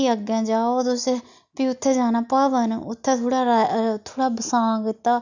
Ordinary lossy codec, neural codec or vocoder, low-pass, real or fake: none; none; 7.2 kHz; real